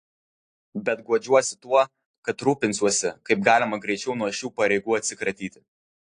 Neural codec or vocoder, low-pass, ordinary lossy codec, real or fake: none; 9.9 kHz; AAC, 48 kbps; real